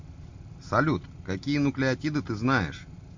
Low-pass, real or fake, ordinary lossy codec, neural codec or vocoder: 7.2 kHz; real; MP3, 48 kbps; none